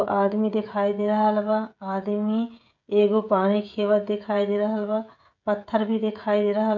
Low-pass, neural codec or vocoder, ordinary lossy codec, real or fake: 7.2 kHz; codec, 16 kHz, 16 kbps, FreqCodec, smaller model; none; fake